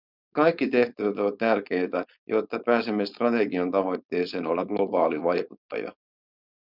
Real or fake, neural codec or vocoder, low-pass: fake; codec, 16 kHz, 4.8 kbps, FACodec; 5.4 kHz